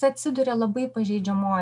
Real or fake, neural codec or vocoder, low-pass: real; none; 10.8 kHz